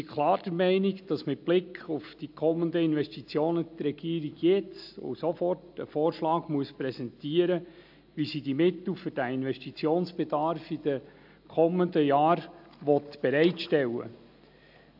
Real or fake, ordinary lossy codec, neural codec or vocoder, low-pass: real; AAC, 48 kbps; none; 5.4 kHz